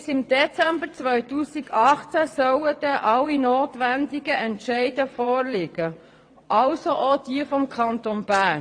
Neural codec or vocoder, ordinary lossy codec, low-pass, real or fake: vocoder, 22.05 kHz, 80 mel bands, WaveNeXt; AAC, 48 kbps; 9.9 kHz; fake